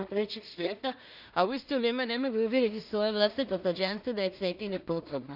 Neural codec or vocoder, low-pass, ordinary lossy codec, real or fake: codec, 16 kHz in and 24 kHz out, 0.4 kbps, LongCat-Audio-Codec, two codebook decoder; 5.4 kHz; none; fake